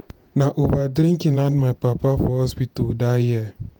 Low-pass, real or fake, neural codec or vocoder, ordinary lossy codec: none; fake; vocoder, 48 kHz, 128 mel bands, Vocos; none